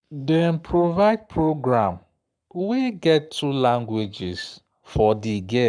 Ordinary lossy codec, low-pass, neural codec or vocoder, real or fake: none; 9.9 kHz; codec, 44.1 kHz, 7.8 kbps, Pupu-Codec; fake